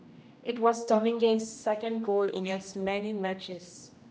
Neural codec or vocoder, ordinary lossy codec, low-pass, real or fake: codec, 16 kHz, 1 kbps, X-Codec, HuBERT features, trained on general audio; none; none; fake